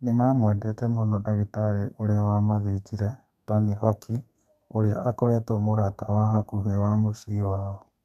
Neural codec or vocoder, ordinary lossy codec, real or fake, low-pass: codec, 32 kHz, 1.9 kbps, SNAC; MP3, 96 kbps; fake; 14.4 kHz